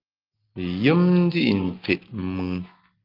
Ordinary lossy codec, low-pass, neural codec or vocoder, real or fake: Opus, 32 kbps; 5.4 kHz; none; real